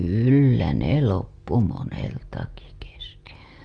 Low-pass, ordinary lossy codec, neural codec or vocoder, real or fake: 9.9 kHz; Opus, 32 kbps; none; real